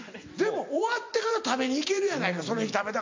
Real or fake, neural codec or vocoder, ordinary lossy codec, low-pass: fake; vocoder, 44.1 kHz, 128 mel bands every 256 samples, BigVGAN v2; MP3, 48 kbps; 7.2 kHz